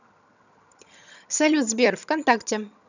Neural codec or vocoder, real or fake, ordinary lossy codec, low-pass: vocoder, 22.05 kHz, 80 mel bands, HiFi-GAN; fake; none; 7.2 kHz